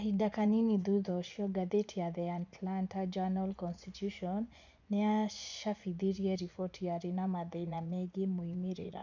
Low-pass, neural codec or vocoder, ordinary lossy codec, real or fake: 7.2 kHz; vocoder, 24 kHz, 100 mel bands, Vocos; none; fake